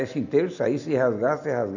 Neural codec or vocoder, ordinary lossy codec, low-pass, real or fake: none; none; 7.2 kHz; real